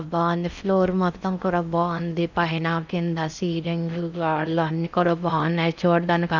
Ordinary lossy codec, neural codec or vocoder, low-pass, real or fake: none; codec, 16 kHz in and 24 kHz out, 0.6 kbps, FocalCodec, streaming, 4096 codes; 7.2 kHz; fake